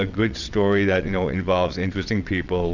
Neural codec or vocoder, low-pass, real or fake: vocoder, 22.05 kHz, 80 mel bands, WaveNeXt; 7.2 kHz; fake